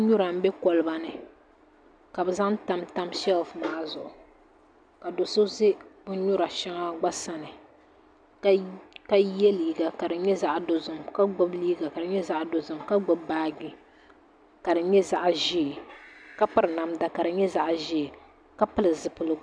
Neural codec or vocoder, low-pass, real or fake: none; 9.9 kHz; real